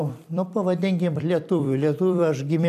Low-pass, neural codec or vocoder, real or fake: 14.4 kHz; vocoder, 44.1 kHz, 128 mel bands every 256 samples, BigVGAN v2; fake